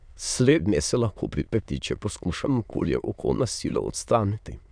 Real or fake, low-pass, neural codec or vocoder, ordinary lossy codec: fake; 9.9 kHz; autoencoder, 22.05 kHz, a latent of 192 numbers a frame, VITS, trained on many speakers; none